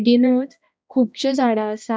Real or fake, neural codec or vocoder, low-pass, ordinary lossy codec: fake; codec, 16 kHz, 1 kbps, X-Codec, HuBERT features, trained on balanced general audio; none; none